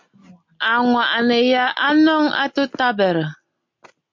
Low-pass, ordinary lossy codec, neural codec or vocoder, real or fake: 7.2 kHz; MP3, 48 kbps; none; real